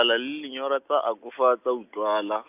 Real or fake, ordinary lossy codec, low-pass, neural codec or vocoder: real; none; 3.6 kHz; none